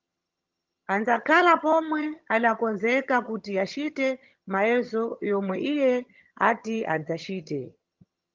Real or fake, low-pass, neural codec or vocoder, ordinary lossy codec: fake; 7.2 kHz; vocoder, 22.05 kHz, 80 mel bands, HiFi-GAN; Opus, 32 kbps